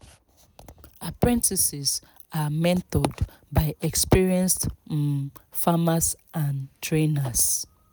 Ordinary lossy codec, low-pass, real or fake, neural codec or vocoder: none; none; real; none